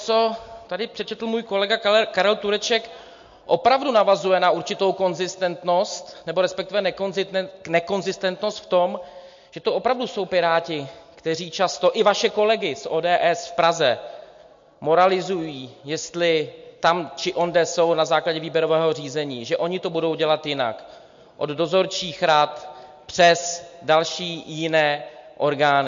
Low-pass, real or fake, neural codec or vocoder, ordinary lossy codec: 7.2 kHz; real; none; MP3, 48 kbps